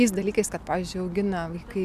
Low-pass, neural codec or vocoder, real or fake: 14.4 kHz; none; real